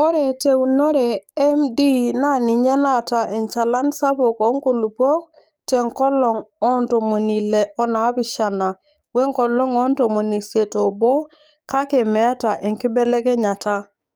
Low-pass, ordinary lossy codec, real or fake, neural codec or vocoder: none; none; fake; codec, 44.1 kHz, 7.8 kbps, DAC